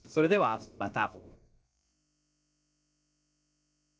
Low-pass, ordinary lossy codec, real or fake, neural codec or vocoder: none; none; fake; codec, 16 kHz, about 1 kbps, DyCAST, with the encoder's durations